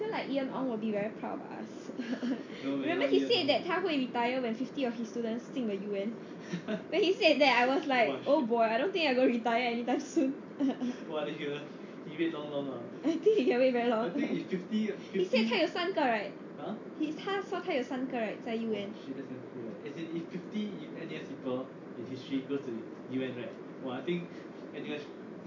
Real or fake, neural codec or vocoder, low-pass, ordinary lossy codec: real; none; 7.2 kHz; MP3, 48 kbps